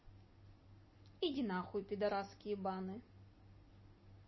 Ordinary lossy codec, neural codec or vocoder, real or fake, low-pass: MP3, 24 kbps; none; real; 7.2 kHz